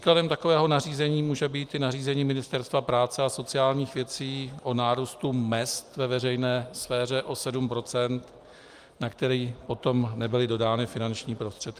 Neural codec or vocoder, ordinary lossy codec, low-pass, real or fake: none; Opus, 32 kbps; 14.4 kHz; real